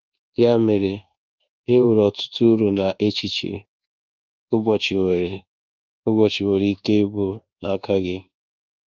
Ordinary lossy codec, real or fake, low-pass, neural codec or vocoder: Opus, 32 kbps; fake; 7.2 kHz; codec, 24 kHz, 1.2 kbps, DualCodec